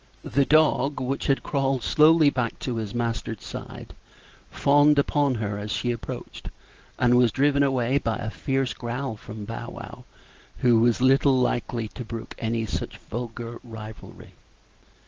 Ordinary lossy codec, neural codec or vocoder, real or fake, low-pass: Opus, 16 kbps; none; real; 7.2 kHz